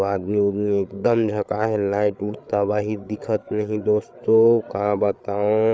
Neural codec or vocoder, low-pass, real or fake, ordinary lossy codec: codec, 16 kHz, 8 kbps, FreqCodec, larger model; none; fake; none